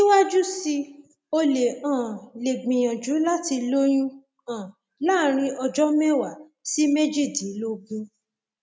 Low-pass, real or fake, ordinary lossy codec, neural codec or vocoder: none; real; none; none